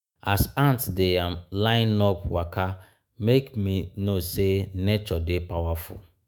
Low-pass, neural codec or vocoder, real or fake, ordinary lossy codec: none; autoencoder, 48 kHz, 128 numbers a frame, DAC-VAE, trained on Japanese speech; fake; none